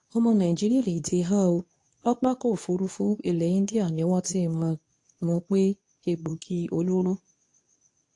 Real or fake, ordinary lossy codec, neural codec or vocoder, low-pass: fake; AAC, 48 kbps; codec, 24 kHz, 0.9 kbps, WavTokenizer, medium speech release version 2; 10.8 kHz